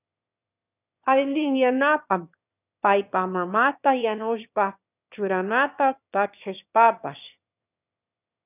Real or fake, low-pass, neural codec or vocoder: fake; 3.6 kHz; autoencoder, 22.05 kHz, a latent of 192 numbers a frame, VITS, trained on one speaker